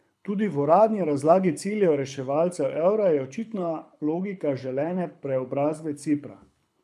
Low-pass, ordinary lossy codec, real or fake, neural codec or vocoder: none; none; fake; codec, 24 kHz, 6 kbps, HILCodec